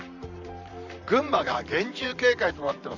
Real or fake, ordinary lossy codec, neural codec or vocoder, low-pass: fake; none; vocoder, 44.1 kHz, 128 mel bands, Pupu-Vocoder; 7.2 kHz